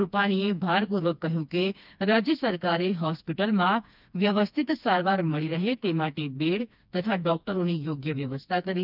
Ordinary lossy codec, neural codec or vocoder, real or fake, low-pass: none; codec, 16 kHz, 2 kbps, FreqCodec, smaller model; fake; 5.4 kHz